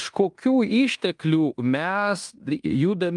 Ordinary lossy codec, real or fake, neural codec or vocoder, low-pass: Opus, 32 kbps; fake; codec, 16 kHz in and 24 kHz out, 0.9 kbps, LongCat-Audio-Codec, fine tuned four codebook decoder; 10.8 kHz